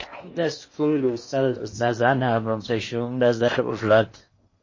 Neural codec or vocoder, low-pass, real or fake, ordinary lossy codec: codec, 16 kHz in and 24 kHz out, 0.8 kbps, FocalCodec, streaming, 65536 codes; 7.2 kHz; fake; MP3, 32 kbps